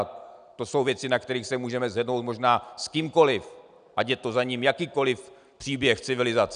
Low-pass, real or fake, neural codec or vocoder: 9.9 kHz; real; none